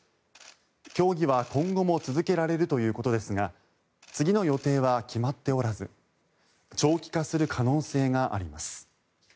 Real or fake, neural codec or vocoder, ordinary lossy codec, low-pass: real; none; none; none